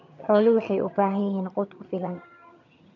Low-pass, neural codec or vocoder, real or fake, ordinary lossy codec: 7.2 kHz; vocoder, 22.05 kHz, 80 mel bands, HiFi-GAN; fake; none